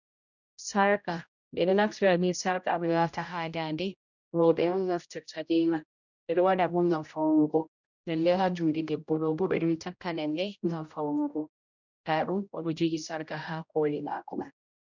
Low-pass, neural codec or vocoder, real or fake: 7.2 kHz; codec, 16 kHz, 0.5 kbps, X-Codec, HuBERT features, trained on general audio; fake